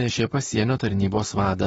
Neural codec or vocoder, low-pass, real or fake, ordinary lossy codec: vocoder, 44.1 kHz, 128 mel bands, Pupu-Vocoder; 19.8 kHz; fake; AAC, 24 kbps